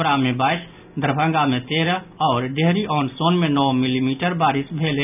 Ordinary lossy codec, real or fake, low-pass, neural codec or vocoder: none; real; 3.6 kHz; none